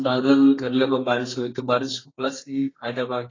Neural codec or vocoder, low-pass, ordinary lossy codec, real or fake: codec, 32 kHz, 1.9 kbps, SNAC; 7.2 kHz; AAC, 32 kbps; fake